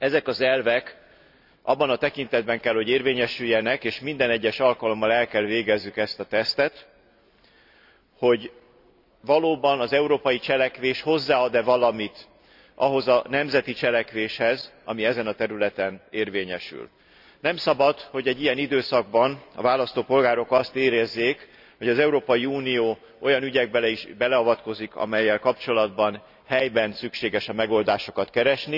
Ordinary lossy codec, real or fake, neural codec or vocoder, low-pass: none; real; none; 5.4 kHz